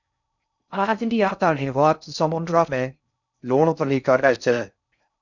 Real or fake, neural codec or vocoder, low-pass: fake; codec, 16 kHz in and 24 kHz out, 0.6 kbps, FocalCodec, streaming, 4096 codes; 7.2 kHz